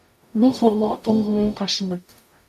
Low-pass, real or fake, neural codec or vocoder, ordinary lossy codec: 14.4 kHz; fake; codec, 44.1 kHz, 0.9 kbps, DAC; MP3, 96 kbps